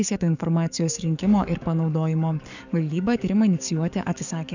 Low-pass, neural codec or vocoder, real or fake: 7.2 kHz; codec, 44.1 kHz, 7.8 kbps, DAC; fake